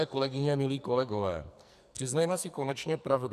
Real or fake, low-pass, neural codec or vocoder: fake; 14.4 kHz; codec, 44.1 kHz, 2.6 kbps, SNAC